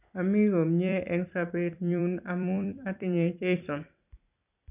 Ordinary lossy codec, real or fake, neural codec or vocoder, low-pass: none; fake; vocoder, 44.1 kHz, 128 mel bands every 256 samples, BigVGAN v2; 3.6 kHz